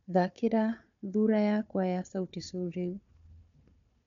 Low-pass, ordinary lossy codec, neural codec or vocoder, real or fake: 7.2 kHz; MP3, 64 kbps; codec, 16 kHz, 4 kbps, FunCodec, trained on Chinese and English, 50 frames a second; fake